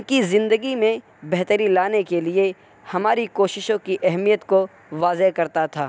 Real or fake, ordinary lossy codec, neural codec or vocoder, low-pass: real; none; none; none